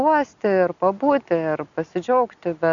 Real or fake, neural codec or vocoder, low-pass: real; none; 7.2 kHz